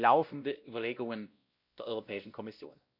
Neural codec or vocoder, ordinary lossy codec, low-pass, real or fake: codec, 16 kHz, 1 kbps, X-Codec, WavLM features, trained on Multilingual LibriSpeech; Opus, 64 kbps; 5.4 kHz; fake